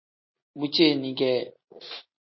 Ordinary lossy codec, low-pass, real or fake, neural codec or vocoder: MP3, 24 kbps; 7.2 kHz; real; none